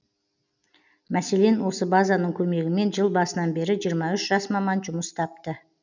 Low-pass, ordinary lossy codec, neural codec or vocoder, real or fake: 7.2 kHz; none; none; real